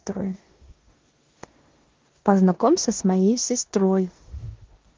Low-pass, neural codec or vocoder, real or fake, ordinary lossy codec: 7.2 kHz; codec, 16 kHz in and 24 kHz out, 0.9 kbps, LongCat-Audio-Codec, four codebook decoder; fake; Opus, 16 kbps